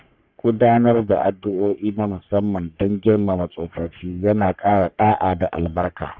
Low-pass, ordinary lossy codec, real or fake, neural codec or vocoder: 7.2 kHz; none; fake; codec, 44.1 kHz, 3.4 kbps, Pupu-Codec